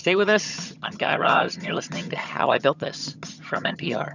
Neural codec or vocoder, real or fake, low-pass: vocoder, 22.05 kHz, 80 mel bands, HiFi-GAN; fake; 7.2 kHz